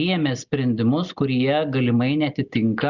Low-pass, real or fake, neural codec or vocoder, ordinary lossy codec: 7.2 kHz; real; none; Opus, 64 kbps